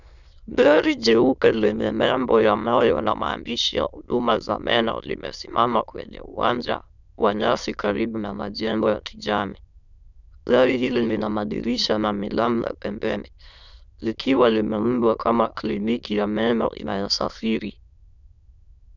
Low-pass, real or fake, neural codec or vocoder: 7.2 kHz; fake; autoencoder, 22.05 kHz, a latent of 192 numbers a frame, VITS, trained on many speakers